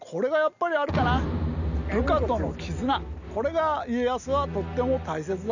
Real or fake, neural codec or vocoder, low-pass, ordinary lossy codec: real; none; 7.2 kHz; none